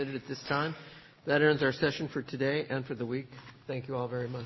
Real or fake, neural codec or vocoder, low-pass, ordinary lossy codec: real; none; 7.2 kHz; MP3, 24 kbps